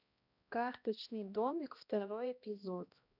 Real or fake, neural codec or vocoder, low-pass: fake; codec, 16 kHz, 1 kbps, X-Codec, HuBERT features, trained on balanced general audio; 5.4 kHz